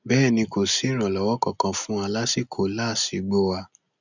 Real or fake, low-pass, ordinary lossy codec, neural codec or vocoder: real; 7.2 kHz; none; none